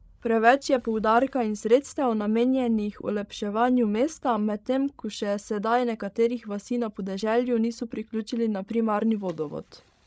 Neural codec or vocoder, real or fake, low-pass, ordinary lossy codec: codec, 16 kHz, 16 kbps, FreqCodec, larger model; fake; none; none